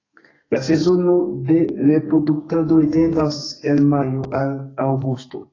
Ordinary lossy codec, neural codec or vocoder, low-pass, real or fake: AAC, 32 kbps; codec, 32 kHz, 1.9 kbps, SNAC; 7.2 kHz; fake